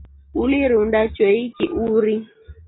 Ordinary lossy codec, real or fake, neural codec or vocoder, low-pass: AAC, 16 kbps; real; none; 7.2 kHz